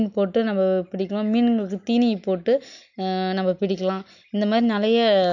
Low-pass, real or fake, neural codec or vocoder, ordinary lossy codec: 7.2 kHz; real; none; none